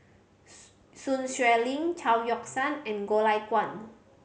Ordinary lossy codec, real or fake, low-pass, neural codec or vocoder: none; real; none; none